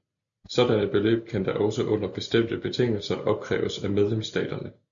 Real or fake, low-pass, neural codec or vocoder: real; 7.2 kHz; none